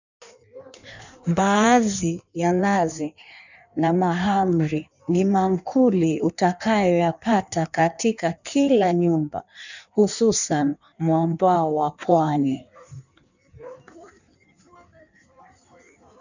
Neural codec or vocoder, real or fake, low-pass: codec, 16 kHz in and 24 kHz out, 1.1 kbps, FireRedTTS-2 codec; fake; 7.2 kHz